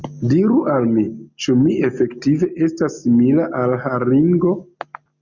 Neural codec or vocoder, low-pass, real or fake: none; 7.2 kHz; real